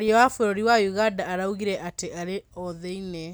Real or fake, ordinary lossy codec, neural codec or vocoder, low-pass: real; none; none; none